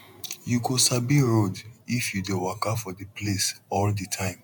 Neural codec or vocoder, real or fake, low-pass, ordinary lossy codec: vocoder, 48 kHz, 128 mel bands, Vocos; fake; 19.8 kHz; none